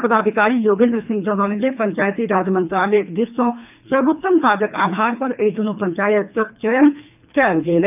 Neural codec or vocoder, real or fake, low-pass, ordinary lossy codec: codec, 24 kHz, 3 kbps, HILCodec; fake; 3.6 kHz; none